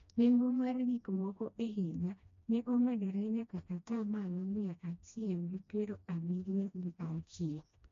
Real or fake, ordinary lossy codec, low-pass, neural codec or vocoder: fake; MP3, 48 kbps; 7.2 kHz; codec, 16 kHz, 1 kbps, FreqCodec, smaller model